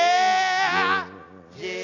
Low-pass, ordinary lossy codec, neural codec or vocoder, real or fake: 7.2 kHz; AAC, 32 kbps; none; real